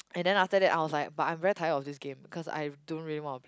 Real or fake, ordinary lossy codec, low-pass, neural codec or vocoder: real; none; none; none